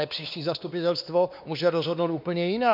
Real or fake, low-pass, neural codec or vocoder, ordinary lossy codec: fake; 5.4 kHz; codec, 16 kHz, 2 kbps, X-Codec, WavLM features, trained on Multilingual LibriSpeech; MP3, 48 kbps